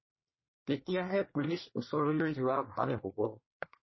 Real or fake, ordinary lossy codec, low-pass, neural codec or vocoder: fake; MP3, 24 kbps; 7.2 kHz; codec, 24 kHz, 1 kbps, SNAC